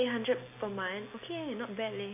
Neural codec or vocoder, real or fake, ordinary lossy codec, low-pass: none; real; AAC, 24 kbps; 3.6 kHz